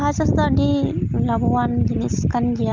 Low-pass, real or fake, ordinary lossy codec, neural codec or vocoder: 7.2 kHz; real; Opus, 24 kbps; none